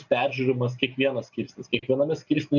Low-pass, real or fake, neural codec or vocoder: 7.2 kHz; real; none